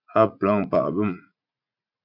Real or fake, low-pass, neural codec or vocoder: fake; 5.4 kHz; vocoder, 44.1 kHz, 80 mel bands, Vocos